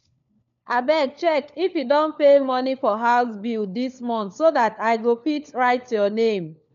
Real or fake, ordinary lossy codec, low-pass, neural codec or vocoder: fake; none; 7.2 kHz; codec, 16 kHz, 4 kbps, FunCodec, trained on LibriTTS, 50 frames a second